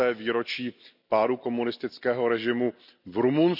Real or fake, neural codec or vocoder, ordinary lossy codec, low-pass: real; none; none; 5.4 kHz